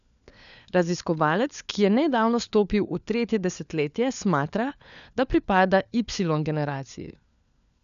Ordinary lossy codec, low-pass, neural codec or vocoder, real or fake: none; 7.2 kHz; codec, 16 kHz, 4 kbps, FunCodec, trained on LibriTTS, 50 frames a second; fake